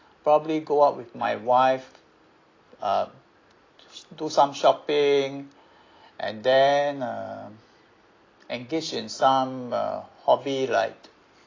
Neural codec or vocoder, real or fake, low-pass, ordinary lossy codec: none; real; 7.2 kHz; AAC, 32 kbps